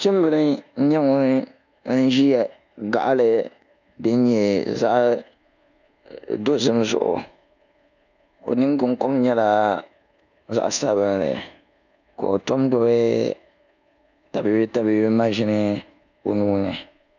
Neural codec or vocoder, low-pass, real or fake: codec, 24 kHz, 1.2 kbps, DualCodec; 7.2 kHz; fake